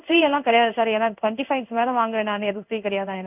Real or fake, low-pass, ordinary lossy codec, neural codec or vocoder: fake; 3.6 kHz; none; codec, 16 kHz in and 24 kHz out, 1 kbps, XY-Tokenizer